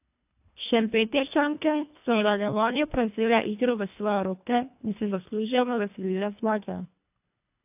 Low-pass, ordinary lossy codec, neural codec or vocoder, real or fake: 3.6 kHz; AAC, 32 kbps; codec, 24 kHz, 1.5 kbps, HILCodec; fake